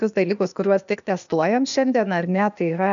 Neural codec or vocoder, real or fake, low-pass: codec, 16 kHz, 0.8 kbps, ZipCodec; fake; 7.2 kHz